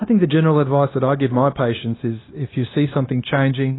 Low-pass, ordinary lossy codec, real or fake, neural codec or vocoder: 7.2 kHz; AAC, 16 kbps; fake; codec, 16 kHz, 0.9 kbps, LongCat-Audio-Codec